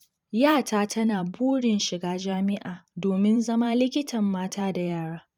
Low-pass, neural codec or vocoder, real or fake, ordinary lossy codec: 19.8 kHz; vocoder, 44.1 kHz, 128 mel bands every 512 samples, BigVGAN v2; fake; none